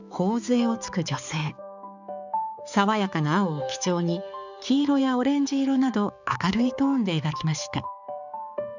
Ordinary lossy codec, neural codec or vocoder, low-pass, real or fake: none; codec, 16 kHz, 4 kbps, X-Codec, HuBERT features, trained on balanced general audio; 7.2 kHz; fake